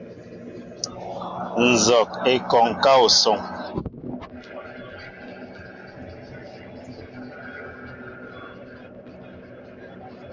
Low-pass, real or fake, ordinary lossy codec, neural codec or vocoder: 7.2 kHz; real; MP3, 48 kbps; none